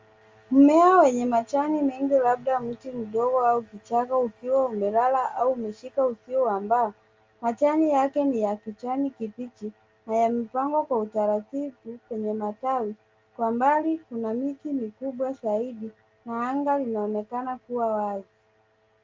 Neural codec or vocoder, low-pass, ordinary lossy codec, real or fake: none; 7.2 kHz; Opus, 32 kbps; real